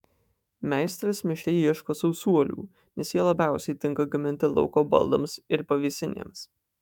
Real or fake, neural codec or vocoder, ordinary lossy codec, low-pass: fake; autoencoder, 48 kHz, 128 numbers a frame, DAC-VAE, trained on Japanese speech; MP3, 96 kbps; 19.8 kHz